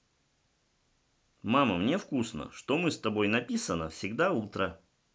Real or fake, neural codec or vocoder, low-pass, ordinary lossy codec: real; none; none; none